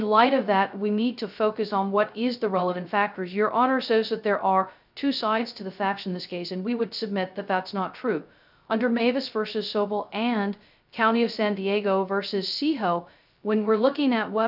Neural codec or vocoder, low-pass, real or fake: codec, 16 kHz, 0.2 kbps, FocalCodec; 5.4 kHz; fake